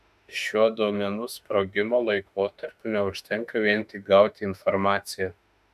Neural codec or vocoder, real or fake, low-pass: autoencoder, 48 kHz, 32 numbers a frame, DAC-VAE, trained on Japanese speech; fake; 14.4 kHz